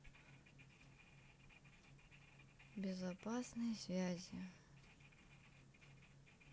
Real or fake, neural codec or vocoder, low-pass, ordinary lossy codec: real; none; none; none